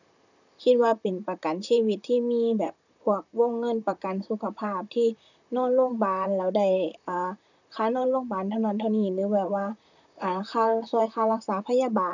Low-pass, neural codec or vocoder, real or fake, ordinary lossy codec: 7.2 kHz; vocoder, 44.1 kHz, 128 mel bands, Pupu-Vocoder; fake; none